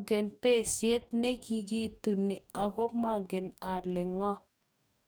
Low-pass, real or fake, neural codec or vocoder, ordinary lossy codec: none; fake; codec, 44.1 kHz, 2.6 kbps, DAC; none